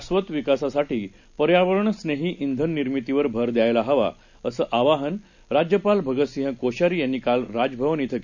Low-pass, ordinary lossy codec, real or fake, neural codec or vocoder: 7.2 kHz; none; real; none